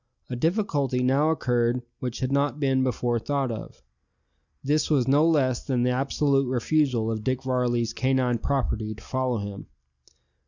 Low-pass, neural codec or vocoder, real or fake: 7.2 kHz; none; real